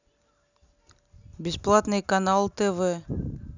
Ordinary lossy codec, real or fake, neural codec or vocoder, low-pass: none; real; none; 7.2 kHz